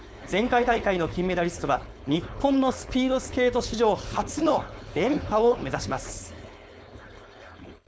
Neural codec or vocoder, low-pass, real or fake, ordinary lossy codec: codec, 16 kHz, 4.8 kbps, FACodec; none; fake; none